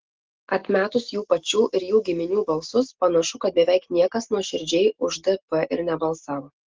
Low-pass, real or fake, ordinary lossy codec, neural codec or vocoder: 7.2 kHz; real; Opus, 16 kbps; none